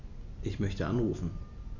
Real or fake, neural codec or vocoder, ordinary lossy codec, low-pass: real; none; AAC, 48 kbps; 7.2 kHz